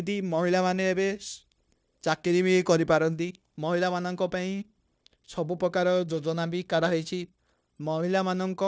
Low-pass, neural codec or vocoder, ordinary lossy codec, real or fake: none; codec, 16 kHz, 0.9 kbps, LongCat-Audio-Codec; none; fake